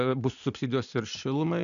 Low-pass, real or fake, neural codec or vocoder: 7.2 kHz; real; none